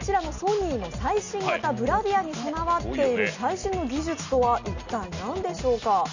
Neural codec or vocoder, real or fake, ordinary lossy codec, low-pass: none; real; none; 7.2 kHz